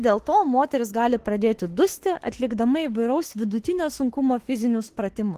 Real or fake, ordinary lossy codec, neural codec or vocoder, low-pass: fake; Opus, 16 kbps; autoencoder, 48 kHz, 32 numbers a frame, DAC-VAE, trained on Japanese speech; 14.4 kHz